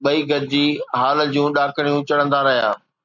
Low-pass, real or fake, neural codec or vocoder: 7.2 kHz; real; none